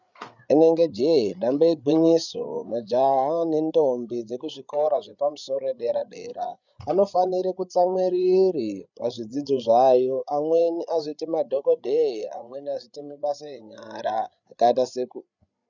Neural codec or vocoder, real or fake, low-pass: codec, 16 kHz, 8 kbps, FreqCodec, larger model; fake; 7.2 kHz